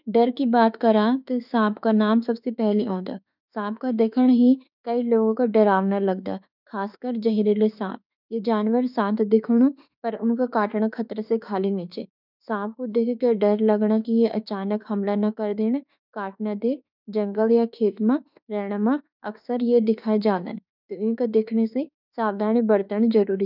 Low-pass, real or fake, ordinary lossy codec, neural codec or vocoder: 5.4 kHz; fake; none; autoencoder, 48 kHz, 32 numbers a frame, DAC-VAE, trained on Japanese speech